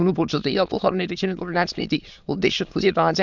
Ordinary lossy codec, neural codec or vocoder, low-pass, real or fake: none; autoencoder, 22.05 kHz, a latent of 192 numbers a frame, VITS, trained on many speakers; 7.2 kHz; fake